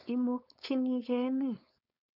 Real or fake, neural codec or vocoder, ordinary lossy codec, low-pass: fake; codec, 16 kHz, 4.8 kbps, FACodec; none; 5.4 kHz